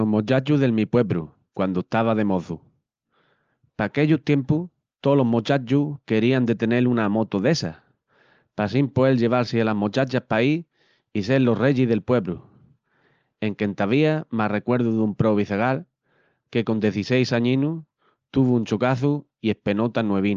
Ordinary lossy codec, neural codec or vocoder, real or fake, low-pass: Opus, 24 kbps; none; real; 7.2 kHz